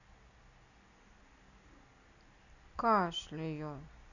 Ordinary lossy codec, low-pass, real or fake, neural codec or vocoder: none; 7.2 kHz; real; none